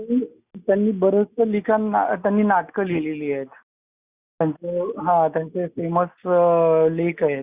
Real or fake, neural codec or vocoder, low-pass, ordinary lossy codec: real; none; 3.6 kHz; Opus, 64 kbps